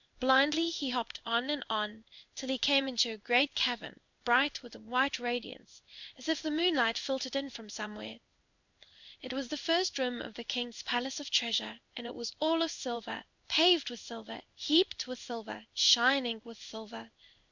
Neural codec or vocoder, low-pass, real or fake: codec, 16 kHz in and 24 kHz out, 1 kbps, XY-Tokenizer; 7.2 kHz; fake